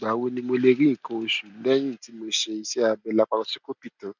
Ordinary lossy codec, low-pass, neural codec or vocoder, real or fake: none; 7.2 kHz; none; real